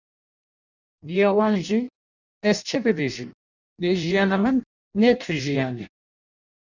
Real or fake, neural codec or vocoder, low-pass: fake; codec, 16 kHz in and 24 kHz out, 0.6 kbps, FireRedTTS-2 codec; 7.2 kHz